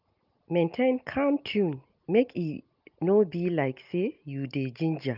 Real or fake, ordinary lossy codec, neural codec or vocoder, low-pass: real; Opus, 24 kbps; none; 5.4 kHz